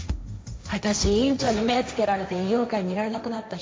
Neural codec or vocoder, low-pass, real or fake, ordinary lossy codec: codec, 16 kHz, 1.1 kbps, Voila-Tokenizer; none; fake; none